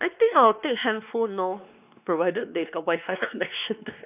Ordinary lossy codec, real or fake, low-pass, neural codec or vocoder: none; fake; 3.6 kHz; codec, 16 kHz, 2 kbps, X-Codec, WavLM features, trained on Multilingual LibriSpeech